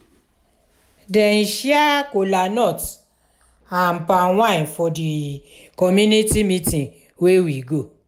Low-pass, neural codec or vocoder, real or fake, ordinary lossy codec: 19.8 kHz; none; real; none